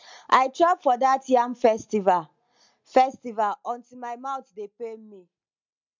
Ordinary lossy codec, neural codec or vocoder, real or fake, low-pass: MP3, 64 kbps; none; real; 7.2 kHz